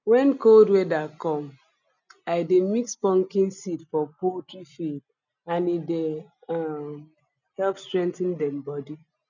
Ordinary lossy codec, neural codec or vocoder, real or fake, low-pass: none; none; real; 7.2 kHz